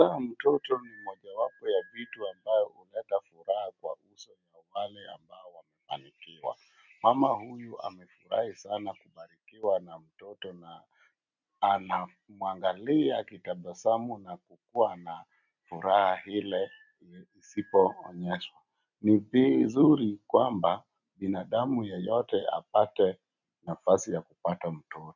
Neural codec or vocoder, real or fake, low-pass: none; real; 7.2 kHz